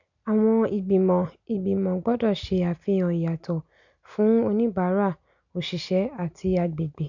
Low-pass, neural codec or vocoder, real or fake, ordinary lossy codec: 7.2 kHz; none; real; none